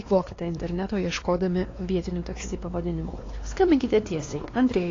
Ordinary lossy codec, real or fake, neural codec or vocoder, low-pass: AAC, 32 kbps; fake; codec, 16 kHz, 2 kbps, X-Codec, WavLM features, trained on Multilingual LibriSpeech; 7.2 kHz